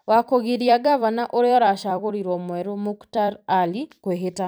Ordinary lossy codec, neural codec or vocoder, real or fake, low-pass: none; vocoder, 44.1 kHz, 128 mel bands every 256 samples, BigVGAN v2; fake; none